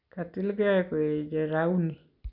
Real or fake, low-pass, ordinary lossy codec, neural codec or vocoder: real; 5.4 kHz; none; none